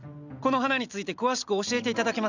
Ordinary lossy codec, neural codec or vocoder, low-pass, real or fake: none; none; 7.2 kHz; real